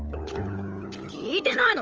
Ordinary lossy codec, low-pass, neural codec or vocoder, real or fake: Opus, 24 kbps; 7.2 kHz; codec, 16 kHz, 16 kbps, FunCodec, trained on LibriTTS, 50 frames a second; fake